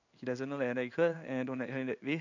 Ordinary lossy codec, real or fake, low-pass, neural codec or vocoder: none; fake; 7.2 kHz; codec, 16 kHz in and 24 kHz out, 1 kbps, XY-Tokenizer